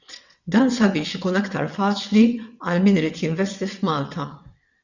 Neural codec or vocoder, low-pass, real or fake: vocoder, 22.05 kHz, 80 mel bands, WaveNeXt; 7.2 kHz; fake